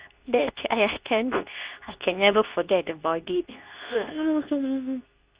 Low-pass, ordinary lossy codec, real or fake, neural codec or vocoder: 3.6 kHz; Opus, 64 kbps; fake; codec, 24 kHz, 0.9 kbps, WavTokenizer, medium speech release version 2